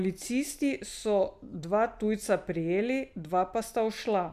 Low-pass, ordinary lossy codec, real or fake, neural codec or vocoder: 14.4 kHz; none; real; none